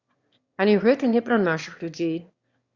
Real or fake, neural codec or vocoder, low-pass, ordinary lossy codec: fake; autoencoder, 22.05 kHz, a latent of 192 numbers a frame, VITS, trained on one speaker; 7.2 kHz; Opus, 64 kbps